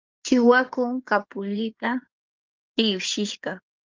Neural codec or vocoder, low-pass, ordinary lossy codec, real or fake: codec, 16 kHz in and 24 kHz out, 2.2 kbps, FireRedTTS-2 codec; 7.2 kHz; Opus, 32 kbps; fake